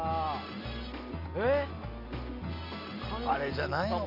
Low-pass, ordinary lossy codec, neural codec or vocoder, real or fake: 5.4 kHz; none; none; real